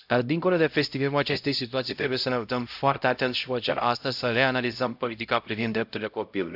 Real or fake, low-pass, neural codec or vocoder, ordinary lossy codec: fake; 5.4 kHz; codec, 16 kHz, 0.5 kbps, X-Codec, HuBERT features, trained on LibriSpeech; AAC, 48 kbps